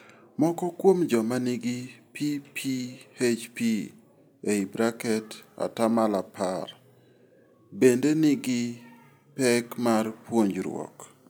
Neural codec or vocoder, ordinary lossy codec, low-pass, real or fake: none; none; none; real